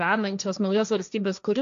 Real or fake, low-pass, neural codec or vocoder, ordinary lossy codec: fake; 7.2 kHz; codec, 16 kHz, 1.1 kbps, Voila-Tokenizer; MP3, 64 kbps